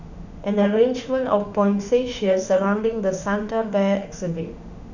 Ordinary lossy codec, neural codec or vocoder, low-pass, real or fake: none; autoencoder, 48 kHz, 32 numbers a frame, DAC-VAE, trained on Japanese speech; 7.2 kHz; fake